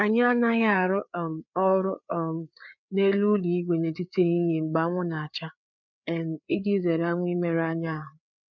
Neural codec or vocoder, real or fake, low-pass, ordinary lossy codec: codec, 16 kHz, 4 kbps, FreqCodec, larger model; fake; 7.2 kHz; none